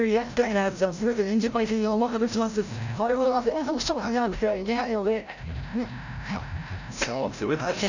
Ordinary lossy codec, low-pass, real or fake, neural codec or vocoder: none; 7.2 kHz; fake; codec, 16 kHz, 0.5 kbps, FreqCodec, larger model